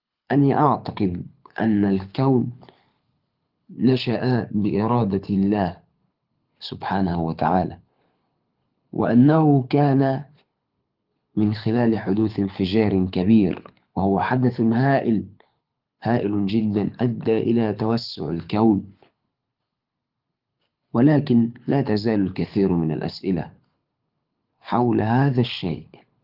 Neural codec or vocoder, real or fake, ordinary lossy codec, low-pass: codec, 24 kHz, 6 kbps, HILCodec; fake; Opus, 32 kbps; 5.4 kHz